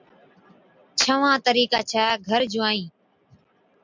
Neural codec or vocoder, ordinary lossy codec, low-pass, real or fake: none; MP3, 64 kbps; 7.2 kHz; real